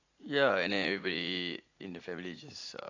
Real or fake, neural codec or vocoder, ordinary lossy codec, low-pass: fake; vocoder, 44.1 kHz, 80 mel bands, Vocos; AAC, 48 kbps; 7.2 kHz